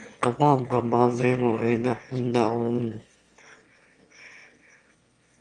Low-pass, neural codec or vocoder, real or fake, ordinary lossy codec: 9.9 kHz; autoencoder, 22.05 kHz, a latent of 192 numbers a frame, VITS, trained on one speaker; fake; Opus, 32 kbps